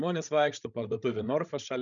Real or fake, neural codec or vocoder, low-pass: fake; codec, 16 kHz, 16 kbps, FreqCodec, larger model; 7.2 kHz